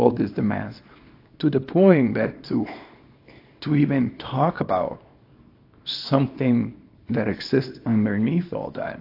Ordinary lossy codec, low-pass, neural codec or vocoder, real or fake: AAC, 32 kbps; 5.4 kHz; codec, 24 kHz, 0.9 kbps, WavTokenizer, small release; fake